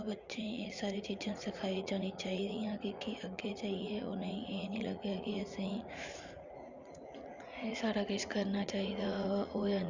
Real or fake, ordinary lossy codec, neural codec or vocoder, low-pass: fake; Opus, 64 kbps; vocoder, 44.1 kHz, 80 mel bands, Vocos; 7.2 kHz